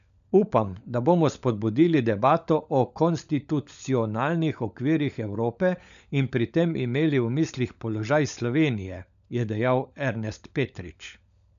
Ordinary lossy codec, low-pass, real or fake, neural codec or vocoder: none; 7.2 kHz; fake; codec, 16 kHz, 16 kbps, FunCodec, trained on LibriTTS, 50 frames a second